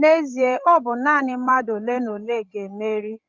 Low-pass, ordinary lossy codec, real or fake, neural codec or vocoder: 7.2 kHz; Opus, 32 kbps; fake; codec, 16 kHz, 16 kbps, FreqCodec, larger model